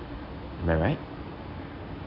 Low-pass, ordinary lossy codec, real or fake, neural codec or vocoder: 5.4 kHz; none; real; none